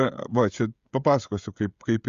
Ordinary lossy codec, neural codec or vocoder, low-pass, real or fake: Opus, 64 kbps; codec, 16 kHz, 16 kbps, FreqCodec, smaller model; 7.2 kHz; fake